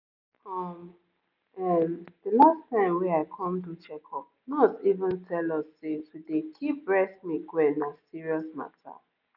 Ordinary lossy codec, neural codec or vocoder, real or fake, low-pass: none; none; real; 5.4 kHz